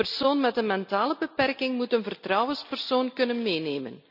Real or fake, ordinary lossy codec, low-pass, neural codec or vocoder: real; none; 5.4 kHz; none